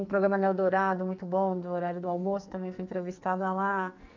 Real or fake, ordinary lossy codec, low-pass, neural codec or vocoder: fake; MP3, 64 kbps; 7.2 kHz; codec, 44.1 kHz, 2.6 kbps, SNAC